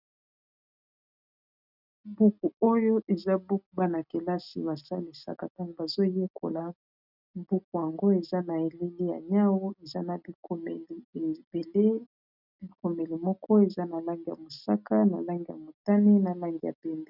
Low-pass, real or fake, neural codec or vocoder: 5.4 kHz; real; none